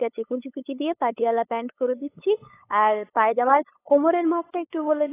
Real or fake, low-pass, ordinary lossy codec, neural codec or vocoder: fake; 3.6 kHz; AAC, 16 kbps; codec, 16 kHz, 8 kbps, FunCodec, trained on LibriTTS, 25 frames a second